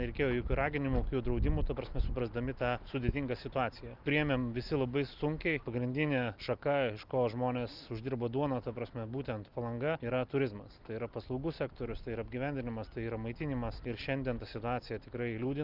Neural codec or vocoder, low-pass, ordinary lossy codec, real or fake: none; 5.4 kHz; Opus, 32 kbps; real